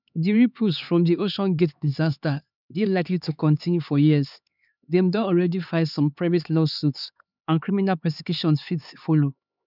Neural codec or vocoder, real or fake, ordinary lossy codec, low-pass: codec, 16 kHz, 4 kbps, X-Codec, HuBERT features, trained on LibriSpeech; fake; none; 5.4 kHz